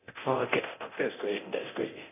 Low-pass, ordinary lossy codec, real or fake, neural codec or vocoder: 3.6 kHz; none; fake; codec, 24 kHz, 0.9 kbps, DualCodec